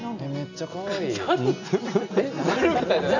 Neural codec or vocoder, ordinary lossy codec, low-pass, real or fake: none; none; 7.2 kHz; real